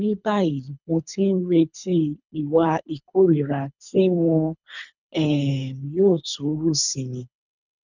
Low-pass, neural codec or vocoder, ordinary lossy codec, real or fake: 7.2 kHz; codec, 24 kHz, 3 kbps, HILCodec; none; fake